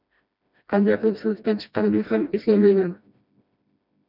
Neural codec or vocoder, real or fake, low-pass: codec, 16 kHz, 1 kbps, FreqCodec, smaller model; fake; 5.4 kHz